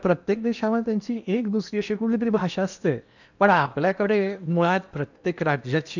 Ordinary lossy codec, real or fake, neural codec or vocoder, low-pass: none; fake; codec, 16 kHz in and 24 kHz out, 0.8 kbps, FocalCodec, streaming, 65536 codes; 7.2 kHz